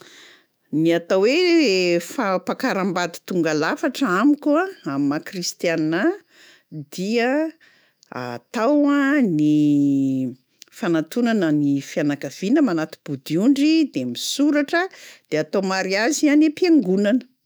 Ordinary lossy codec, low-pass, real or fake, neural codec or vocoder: none; none; fake; autoencoder, 48 kHz, 128 numbers a frame, DAC-VAE, trained on Japanese speech